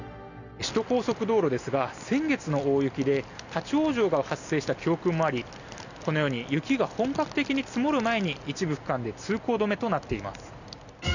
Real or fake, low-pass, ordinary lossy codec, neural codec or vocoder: real; 7.2 kHz; none; none